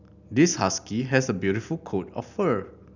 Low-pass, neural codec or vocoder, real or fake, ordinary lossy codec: 7.2 kHz; none; real; none